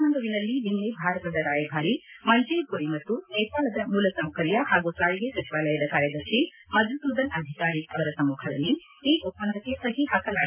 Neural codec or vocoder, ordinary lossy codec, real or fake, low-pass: none; MP3, 32 kbps; real; 3.6 kHz